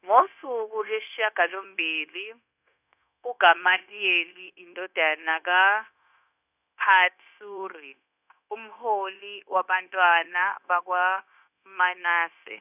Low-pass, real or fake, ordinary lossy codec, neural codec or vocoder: 3.6 kHz; fake; none; codec, 16 kHz, 0.9 kbps, LongCat-Audio-Codec